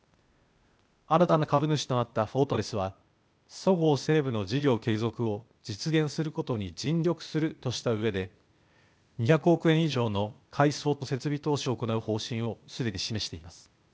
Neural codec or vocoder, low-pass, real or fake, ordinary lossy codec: codec, 16 kHz, 0.8 kbps, ZipCodec; none; fake; none